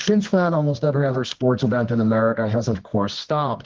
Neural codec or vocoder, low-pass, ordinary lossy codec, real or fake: codec, 24 kHz, 0.9 kbps, WavTokenizer, medium music audio release; 7.2 kHz; Opus, 16 kbps; fake